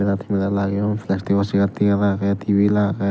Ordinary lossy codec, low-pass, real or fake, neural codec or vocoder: none; none; real; none